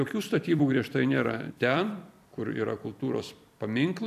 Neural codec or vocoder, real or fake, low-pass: vocoder, 44.1 kHz, 128 mel bands every 256 samples, BigVGAN v2; fake; 14.4 kHz